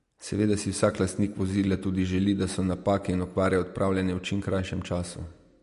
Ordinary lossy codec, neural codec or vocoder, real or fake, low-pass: MP3, 48 kbps; none; real; 14.4 kHz